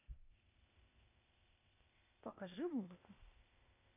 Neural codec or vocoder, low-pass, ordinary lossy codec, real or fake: codec, 16 kHz, 0.8 kbps, ZipCodec; 3.6 kHz; none; fake